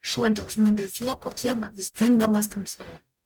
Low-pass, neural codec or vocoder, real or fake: 19.8 kHz; codec, 44.1 kHz, 0.9 kbps, DAC; fake